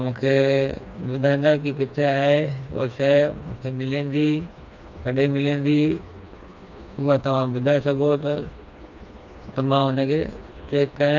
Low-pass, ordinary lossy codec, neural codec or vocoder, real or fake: 7.2 kHz; none; codec, 16 kHz, 2 kbps, FreqCodec, smaller model; fake